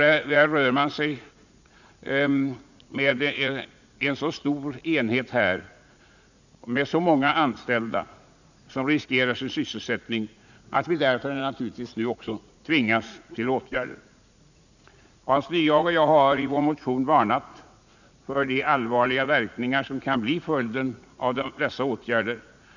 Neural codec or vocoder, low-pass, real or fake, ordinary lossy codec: vocoder, 44.1 kHz, 80 mel bands, Vocos; 7.2 kHz; fake; none